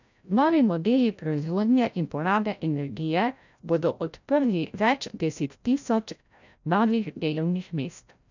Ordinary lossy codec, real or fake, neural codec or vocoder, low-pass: none; fake; codec, 16 kHz, 0.5 kbps, FreqCodec, larger model; 7.2 kHz